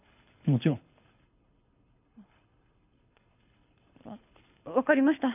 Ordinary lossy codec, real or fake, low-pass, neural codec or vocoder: none; fake; 3.6 kHz; codec, 24 kHz, 6 kbps, HILCodec